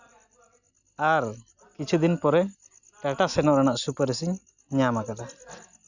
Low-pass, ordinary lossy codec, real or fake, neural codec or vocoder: 7.2 kHz; none; real; none